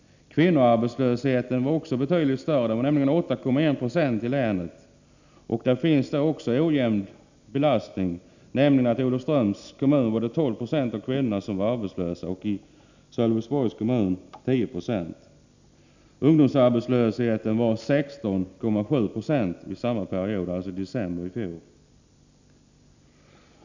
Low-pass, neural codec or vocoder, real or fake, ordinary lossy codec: 7.2 kHz; none; real; none